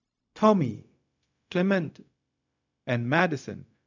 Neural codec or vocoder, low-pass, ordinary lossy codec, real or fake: codec, 16 kHz, 0.4 kbps, LongCat-Audio-Codec; 7.2 kHz; none; fake